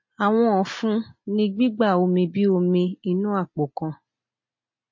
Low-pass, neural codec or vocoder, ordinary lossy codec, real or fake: 7.2 kHz; none; MP3, 32 kbps; real